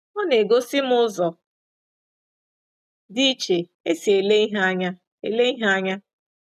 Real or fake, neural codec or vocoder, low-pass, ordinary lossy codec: real; none; 14.4 kHz; none